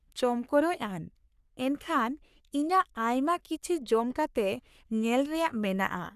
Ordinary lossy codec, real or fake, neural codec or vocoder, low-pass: none; fake; codec, 44.1 kHz, 3.4 kbps, Pupu-Codec; 14.4 kHz